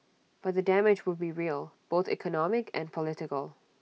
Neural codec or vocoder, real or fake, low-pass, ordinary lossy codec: none; real; none; none